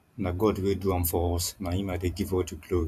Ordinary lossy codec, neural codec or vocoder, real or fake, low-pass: none; vocoder, 44.1 kHz, 128 mel bands every 512 samples, BigVGAN v2; fake; 14.4 kHz